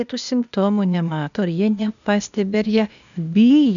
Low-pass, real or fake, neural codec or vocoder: 7.2 kHz; fake; codec, 16 kHz, 0.8 kbps, ZipCodec